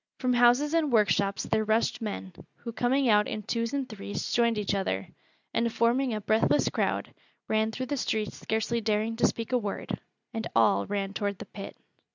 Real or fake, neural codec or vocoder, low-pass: real; none; 7.2 kHz